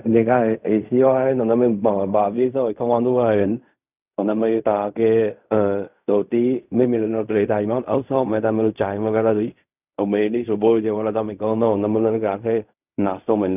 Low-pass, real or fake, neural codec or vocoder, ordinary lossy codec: 3.6 kHz; fake; codec, 16 kHz in and 24 kHz out, 0.4 kbps, LongCat-Audio-Codec, fine tuned four codebook decoder; none